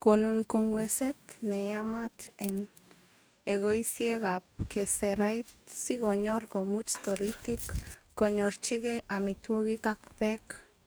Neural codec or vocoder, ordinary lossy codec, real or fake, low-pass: codec, 44.1 kHz, 2.6 kbps, DAC; none; fake; none